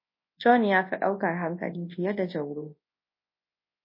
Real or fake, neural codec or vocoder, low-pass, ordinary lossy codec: fake; codec, 24 kHz, 0.9 kbps, WavTokenizer, large speech release; 5.4 kHz; MP3, 24 kbps